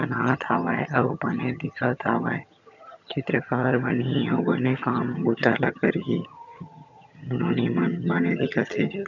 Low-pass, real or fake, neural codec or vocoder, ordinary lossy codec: 7.2 kHz; fake; vocoder, 22.05 kHz, 80 mel bands, HiFi-GAN; none